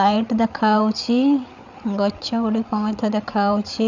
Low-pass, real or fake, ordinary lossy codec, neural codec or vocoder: 7.2 kHz; fake; none; codec, 16 kHz, 8 kbps, FreqCodec, larger model